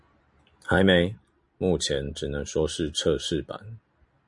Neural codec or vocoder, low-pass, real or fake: none; 10.8 kHz; real